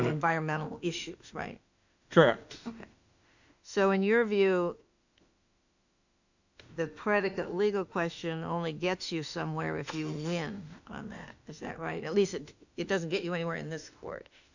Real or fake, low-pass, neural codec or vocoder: fake; 7.2 kHz; autoencoder, 48 kHz, 32 numbers a frame, DAC-VAE, trained on Japanese speech